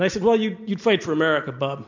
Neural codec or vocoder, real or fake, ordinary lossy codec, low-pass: none; real; MP3, 64 kbps; 7.2 kHz